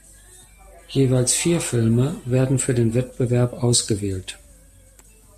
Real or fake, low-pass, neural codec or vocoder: real; 14.4 kHz; none